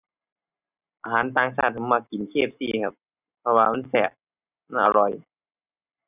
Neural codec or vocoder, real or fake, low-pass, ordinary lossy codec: none; real; 3.6 kHz; none